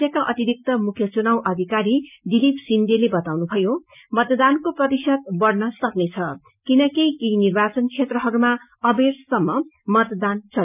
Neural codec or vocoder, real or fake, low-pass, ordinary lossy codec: none; real; 3.6 kHz; none